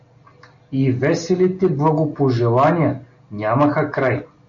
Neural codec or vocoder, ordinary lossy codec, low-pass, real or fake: none; AAC, 64 kbps; 7.2 kHz; real